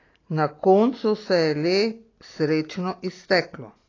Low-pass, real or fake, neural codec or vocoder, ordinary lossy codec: 7.2 kHz; real; none; AAC, 32 kbps